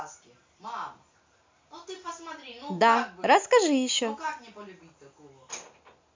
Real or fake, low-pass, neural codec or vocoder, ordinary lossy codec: real; 7.2 kHz; none; MP3, 64 kbps